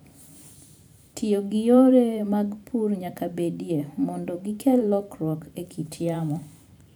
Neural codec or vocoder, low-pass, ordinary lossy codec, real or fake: vocoder, 44.1 kHz, 128 mel bands every 512 samples, BigVGAN v2; none; none; fake